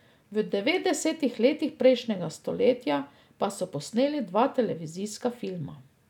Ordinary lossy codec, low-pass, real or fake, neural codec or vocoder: none; 19.8 kHz; real; none